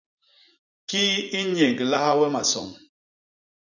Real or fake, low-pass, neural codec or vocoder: fake; 7.2 kHz; vocoder, 44.1 kHz, 80 mel bands, Vocos